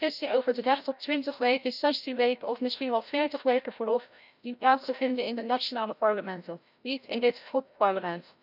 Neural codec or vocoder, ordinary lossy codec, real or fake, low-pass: codec, 16 kHz, 0.5 kbps, FreqCodec, larger model; none; fake; 5.4 kHz